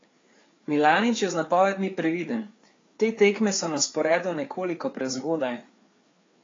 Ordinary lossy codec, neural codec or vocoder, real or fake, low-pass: AAC, 32 kbps; codec, 16 kHz, 4 kbps, FreqCodec, larger model; fake; 7.2 kHz